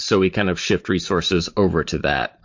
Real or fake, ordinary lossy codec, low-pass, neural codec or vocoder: fake; MP3, 48 kbps; 7.2 kHz; vocoder, 44.1 kHz, 128 mel bands every 512 samples, BigVGAN v2